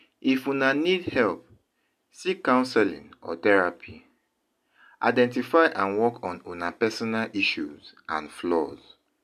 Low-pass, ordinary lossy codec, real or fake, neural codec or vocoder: 14.4 kHz; none; real; none